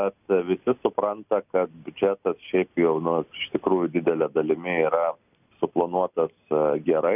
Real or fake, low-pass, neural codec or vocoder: real; 3.6 kHz; none